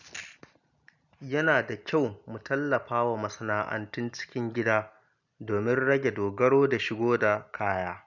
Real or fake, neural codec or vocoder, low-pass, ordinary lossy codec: real; none; 7.2 kHz; none